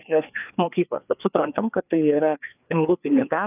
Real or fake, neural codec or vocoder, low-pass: fake; codec, 16 kHz, 2 kbps, FreqCodec, larger model; 3.6 kHz